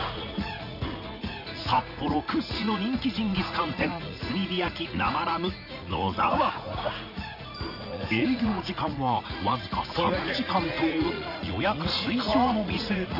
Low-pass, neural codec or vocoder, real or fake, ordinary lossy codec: 5.4 kHz; vocoder, 44.1 kHz, 80 mel bands, Vocos; fake; none